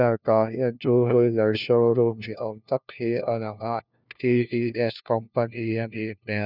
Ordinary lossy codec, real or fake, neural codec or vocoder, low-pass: none; fake; codec, 16 kHz, 1 kbps, FunCodec, trained on LibriTTS, 50 frames a second; 5.4 kHz